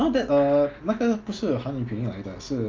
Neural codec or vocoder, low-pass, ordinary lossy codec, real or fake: none; 7.2 kHz; Opus, 24 kbps; real